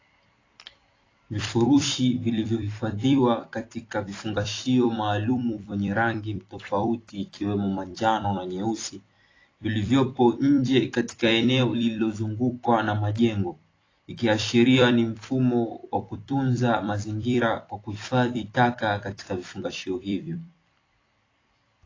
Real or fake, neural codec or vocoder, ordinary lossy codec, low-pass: fake; vocoder, 44.1 kHz, 128 mel bands every 256 samples, BigVGAN v2; AAC, 32 kbps; 7.2 kHz